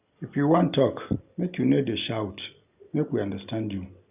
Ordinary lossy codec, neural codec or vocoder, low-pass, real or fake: none; none; 3.6 kHz; real